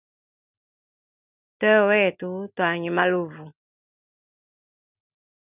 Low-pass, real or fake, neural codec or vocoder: 3.6 kHz; real; none